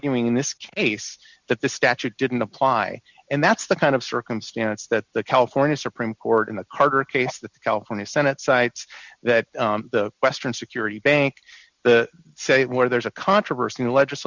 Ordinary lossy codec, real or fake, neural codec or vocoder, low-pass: Opus, 64 kbps; real; none; 7.2 kHz